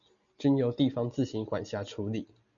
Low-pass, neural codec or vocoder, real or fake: 7.2 kHz; none; real